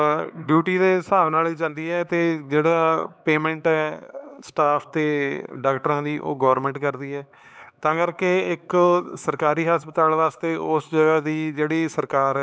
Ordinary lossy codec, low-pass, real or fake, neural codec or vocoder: none; none; fake; codec, 16 kHz, 4 kbps, X-Codec, HuBERT features, trained on LibriSpeech